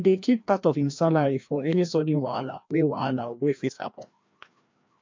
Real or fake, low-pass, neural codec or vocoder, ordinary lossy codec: fake; 7.2 kHz; codec, 16 kHz, 1 kbps, FreqCodec, larger model; MP3, 64 kbps